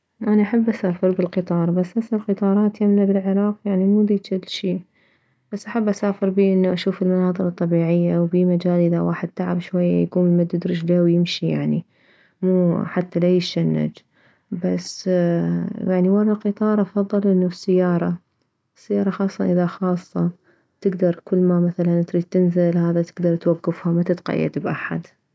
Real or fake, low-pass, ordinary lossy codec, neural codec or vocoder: real; none; none; none